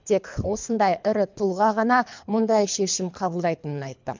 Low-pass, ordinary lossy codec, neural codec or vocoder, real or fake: 7.2 kHz; MP3, 64 kbps; codec, 24 kHz, 3 kbps, HILCodec; fake